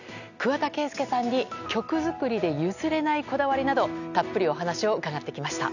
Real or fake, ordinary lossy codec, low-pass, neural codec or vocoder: real; none; 7.2 kHz; none